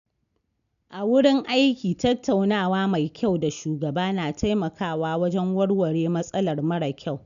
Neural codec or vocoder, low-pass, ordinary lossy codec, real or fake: none; 7.2 kHz; none; real